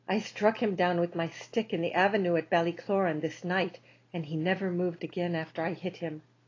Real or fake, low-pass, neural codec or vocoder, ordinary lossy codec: real; 7.2 kHz; none; AAC, 32 kbps